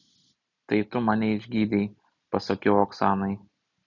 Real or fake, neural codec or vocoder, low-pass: real; none; 7.2 kHz